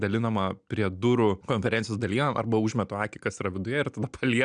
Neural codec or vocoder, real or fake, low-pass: none; real; 9.9 kHz